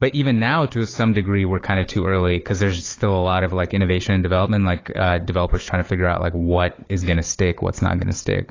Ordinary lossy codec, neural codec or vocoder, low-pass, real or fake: AAC, 32 kbps; codec, 16 kHz, 4 kbps, FunCodec, trained on Chinese and English, 50 frames a second; 7.2 kHz; fake